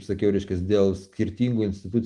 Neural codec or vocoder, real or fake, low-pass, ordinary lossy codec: none; real; 10.8 kHz; Opus, 24 kbps